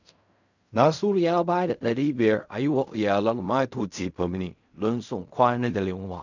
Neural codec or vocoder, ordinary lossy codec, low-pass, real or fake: codec, 16 kHz in and 24 kHz out, 0.4 kbps, LongCat-Audio-Codec, fine tuned four codebook decoder; none; 7.2 kHz; fake